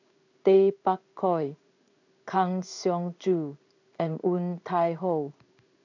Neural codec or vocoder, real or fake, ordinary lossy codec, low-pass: codec, 16 kHz in and 24 kHz out, 1 kbps, XY-Tokenizer; fake; none; 7.2 kHz